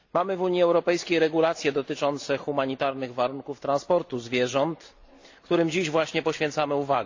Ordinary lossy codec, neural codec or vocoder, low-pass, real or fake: AAC, 48 kbps; none; 7.2 kHz; real